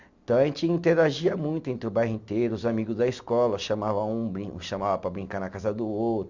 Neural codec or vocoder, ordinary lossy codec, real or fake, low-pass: none; Opus, 64 kbps; real; 7.2 kHz